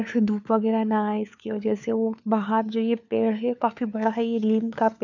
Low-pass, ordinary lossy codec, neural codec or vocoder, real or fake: 7.2 kHz; none; codec, 16 kHz, 4 kbps, FunCodec, trained on LibriTTS, 50 frames a second; fake